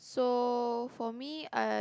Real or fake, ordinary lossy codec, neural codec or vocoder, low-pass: real; none; none; none